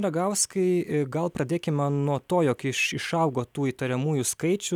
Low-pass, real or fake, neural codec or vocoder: 19.8 kHz; real; none